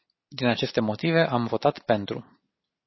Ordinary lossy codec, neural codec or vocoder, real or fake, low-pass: MP3, 24 kbps; none; real; 7.2 kHz